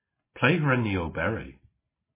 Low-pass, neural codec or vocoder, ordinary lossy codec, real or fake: 3.6 kHz; none; MP3, 16 kbps; real